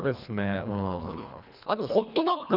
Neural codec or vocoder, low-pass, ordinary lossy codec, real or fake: codec, 24 kHz, 1.5 kbps, HILCodec; 5.4 kHz; none; fake